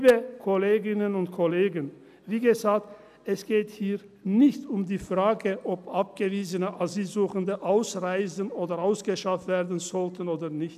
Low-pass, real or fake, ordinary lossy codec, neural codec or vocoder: 14.4 kHz; real; none; none